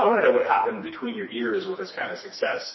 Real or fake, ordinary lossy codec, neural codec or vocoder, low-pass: fake; MP3, 24 kbps; codec, 16 kHz, 2 kbps, FreqCodec, smaller model; 7.2 kHz